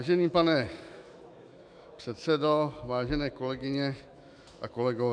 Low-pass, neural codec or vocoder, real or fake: 9.9 kHz; autoencoder, 48 kHz, 128 numbers a frame, DAC-VAE, trained on Japanese speech; fake